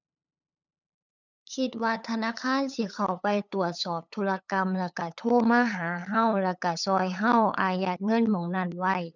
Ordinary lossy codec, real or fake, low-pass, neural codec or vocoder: none; fake; 7.2 kHz; codec, 16 kHz, 8 kbps, FunCodec, trained on LibriTTS, 25 frames a second